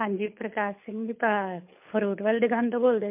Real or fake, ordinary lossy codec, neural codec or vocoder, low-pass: fake; MP3, 32 kbps; codec, 24 kHz, 6 kbps, HILCodec; 3.6 kHz